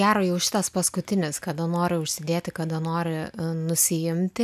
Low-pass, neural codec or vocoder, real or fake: 14.4 kHz; none; real